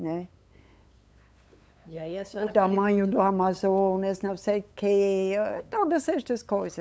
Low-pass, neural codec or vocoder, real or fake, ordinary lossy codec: none; codec, 16 kHz, 8 kbps, FunCodec, trained on LibriTTS, 25 frames a second; fake; none